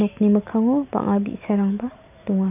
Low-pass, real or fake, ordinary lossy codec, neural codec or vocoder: 3.6 kHz; real; none; none